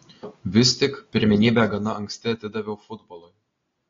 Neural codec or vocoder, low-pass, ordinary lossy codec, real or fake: none; 7.2 kHz; AAC, 32 kbps; real